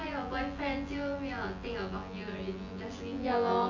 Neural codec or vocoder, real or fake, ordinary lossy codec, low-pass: vocoder, 24 kHz, 100 mel bands, Vocos; fake; MP3, 48 kbps; 7.2 kHz